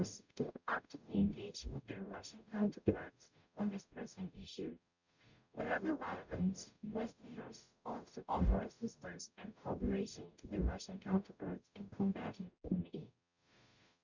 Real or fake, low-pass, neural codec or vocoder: fake; 7.2 kHz; codec, 44.1 kHz, 0.9 kbps, DAC